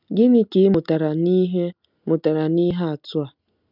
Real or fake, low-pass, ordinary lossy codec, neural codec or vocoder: real; 5.4 kHz; none; none